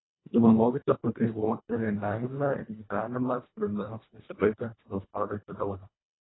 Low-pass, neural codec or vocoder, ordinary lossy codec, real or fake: 7.2 kHz; codec, 24 kHz, 1.5 kbps, HILCodec; AAC, 16 kbps; fake